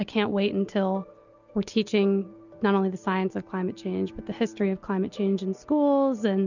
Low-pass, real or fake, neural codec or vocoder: 7.2 kHz; real; none